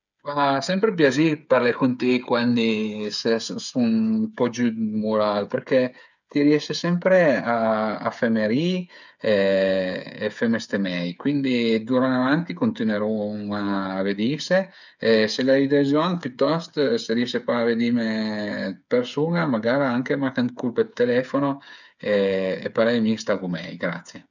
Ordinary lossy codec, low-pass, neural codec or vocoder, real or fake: none; 7.2 kHz; codec, 16 kHz, 8 kbps, FreqCodec, smaller model; fake